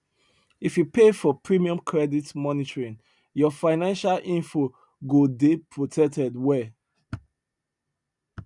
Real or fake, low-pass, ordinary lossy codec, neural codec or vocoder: real; 10.8 kHz; none; none